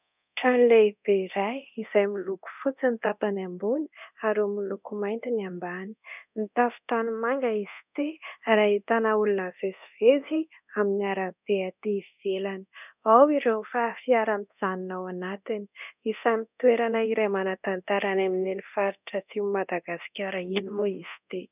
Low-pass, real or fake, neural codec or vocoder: 3.6 kHz; fake; codec, 24 kHz, 0.9 kbps, DualCodec